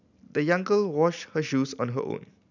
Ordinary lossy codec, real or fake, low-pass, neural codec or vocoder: none; real; 7.2 kHz; none